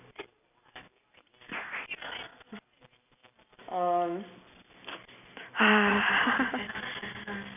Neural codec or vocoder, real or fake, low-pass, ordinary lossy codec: none; real; 3.6 kHz; none